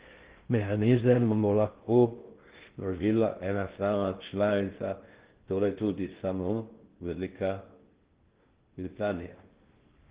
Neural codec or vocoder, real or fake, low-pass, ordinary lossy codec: codec, 16 kHz in and 24 kHz out, 0.6 kbps, FocalCodec, streaming, 2048 codes; fake; 3.6 kHz; Opus, 16 kbps